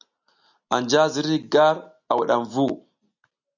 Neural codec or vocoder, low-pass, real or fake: none; 7.2 kHz; real